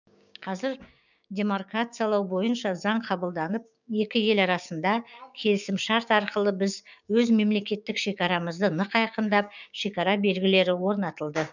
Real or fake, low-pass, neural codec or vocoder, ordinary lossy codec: fake; 7.2 kHz; codec, 16 kHz, 6 kbps, DAC; none